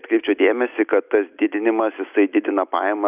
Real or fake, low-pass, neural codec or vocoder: real; 3.6 kHz; none